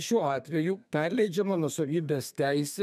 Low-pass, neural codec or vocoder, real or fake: 14.4 kHz; codec, 32 kHz, 1.9 kbps, SNAC; fake